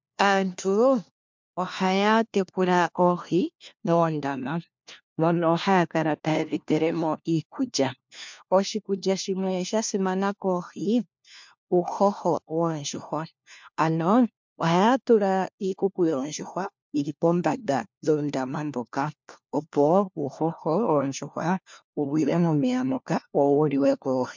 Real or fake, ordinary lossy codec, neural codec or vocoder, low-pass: fake; MP3, 64 kbps; codec, 16 kHz, 1 kbps, FunCodec, trained on LibriTTS, 50 frames a second; 7.2 kHz